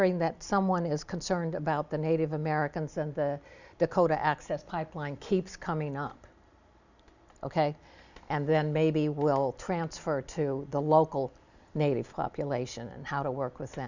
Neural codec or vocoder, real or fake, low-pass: none; real; 7.2 kHz